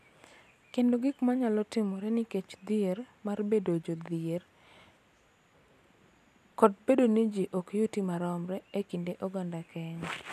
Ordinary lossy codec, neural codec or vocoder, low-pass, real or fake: none; none; 14.4 kHz; real